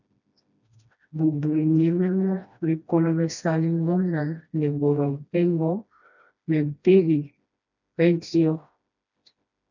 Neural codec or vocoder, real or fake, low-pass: codec, 16 kHz, 1 kbps, FreqCodec, smaller model; fake; 7.2 kHz